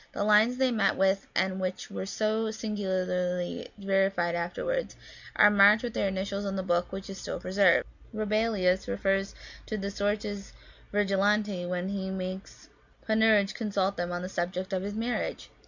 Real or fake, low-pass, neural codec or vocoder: real; 7.2 kHz; none